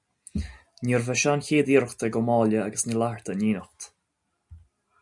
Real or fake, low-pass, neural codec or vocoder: real; 10.8 kHz; none